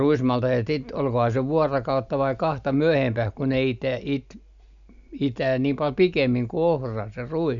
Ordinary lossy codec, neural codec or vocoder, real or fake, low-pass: none; none; real; 7.2 kHz